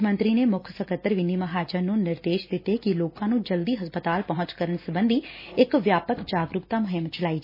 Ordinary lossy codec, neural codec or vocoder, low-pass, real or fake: MP3, 24 kbps; none; 5.4 kHz; real